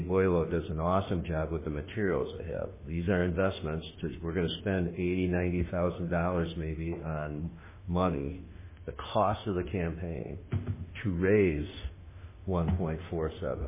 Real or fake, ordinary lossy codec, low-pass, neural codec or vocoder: fake; MP3, 16 kbps; 3.6 kHz; autoencoder, 48 kHz, 32 numbers a frame, DAC-VAE, trained on Japanese speech